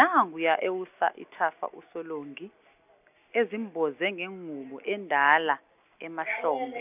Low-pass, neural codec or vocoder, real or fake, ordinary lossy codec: 3.6 kHz; none; real; none